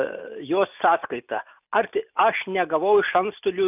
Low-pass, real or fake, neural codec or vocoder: 3.6 kHz; real; none